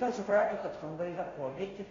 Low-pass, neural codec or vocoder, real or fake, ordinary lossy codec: 7.2 kHz; codec, 16 kHz, 0.5 kbps, FunCodec, trained on Chinese and English, 25 frames a second; fake; AAC, 32 kbps